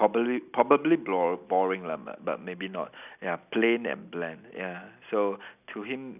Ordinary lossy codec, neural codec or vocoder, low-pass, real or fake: none; none; 3.6 kHz; real